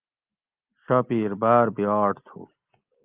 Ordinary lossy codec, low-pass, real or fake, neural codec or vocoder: Opus, 32 kbps; 3.6 kHz; real; none